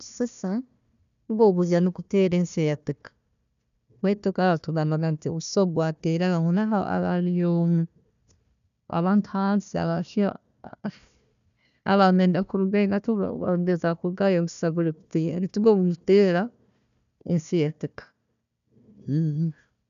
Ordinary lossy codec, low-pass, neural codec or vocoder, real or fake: none; 7.2 kHz; codec, 16 kHz, 1 kbps, FunCodec, trained on Chinese and English, 50 frames a second; fake